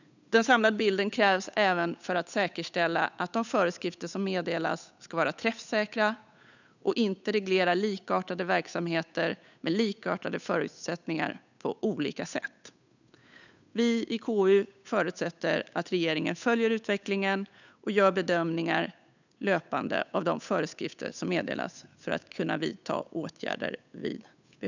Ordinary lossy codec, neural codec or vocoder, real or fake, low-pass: none; codec, 16 kHz, 8 kbps, FunCodec, trained on Chinese and English, 25 frames a second; fake; 7.2 kHz